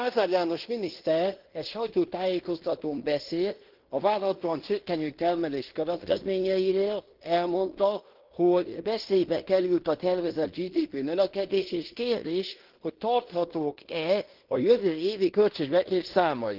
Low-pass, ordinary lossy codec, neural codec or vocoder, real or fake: 5.4 kHz; Opus, 16 kbps; codec, 16 kHz in and 24 kHz out, 0.9 kbps, LongCat-Audio-Codec, fine tuned four codebook decoder; fake